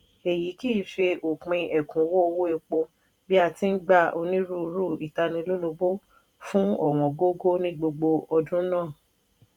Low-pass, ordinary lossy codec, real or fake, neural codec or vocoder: 19.8 kHz; none; fake; vocoder, 44.1 kHz, 128 mel bands every 512 samples, BigVGAN v2